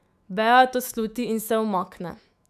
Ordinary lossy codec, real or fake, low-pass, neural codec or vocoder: none; fake; 14.4 kHz; autoencoder, 48 kHz, 128 numbers a frame, DAC-VAE, trained on Japanese speech